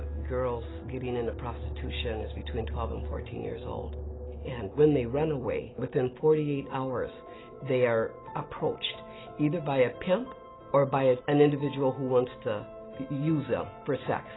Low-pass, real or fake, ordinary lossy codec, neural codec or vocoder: 7.2 kHz; real; AAC, 16 kbps; none